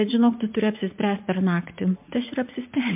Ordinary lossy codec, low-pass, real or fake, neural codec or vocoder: MP3, 32 kbps; 3.6 kHz; fake; vocoder, 44.1 kHz, 80 mel bands, Vocos